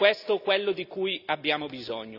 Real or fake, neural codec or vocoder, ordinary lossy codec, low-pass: real; none; none; 5.4 kHz